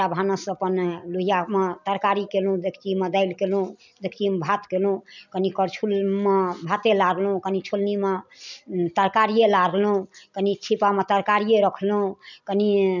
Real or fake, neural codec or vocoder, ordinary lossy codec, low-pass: real; none; none; 7.2 kHz